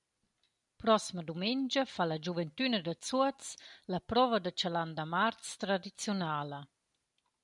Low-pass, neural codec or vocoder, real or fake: 10.8 kHz; none; real